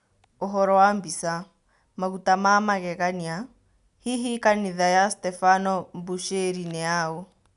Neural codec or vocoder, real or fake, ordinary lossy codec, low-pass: none; real; none; 10.8 kHz